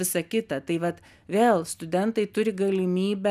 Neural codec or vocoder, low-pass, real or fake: none; 14.4 kHz; real